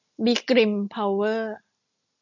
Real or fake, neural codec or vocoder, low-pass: real; none; 7.2 kHz